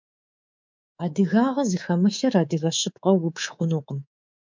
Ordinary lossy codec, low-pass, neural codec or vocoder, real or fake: MP3, 64 kbps; 7.2 kHz; codec, 24 kHz, 3.1 kbps, DualCodec; fake